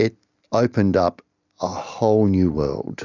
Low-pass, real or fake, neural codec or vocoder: 7.2 kHz; real; none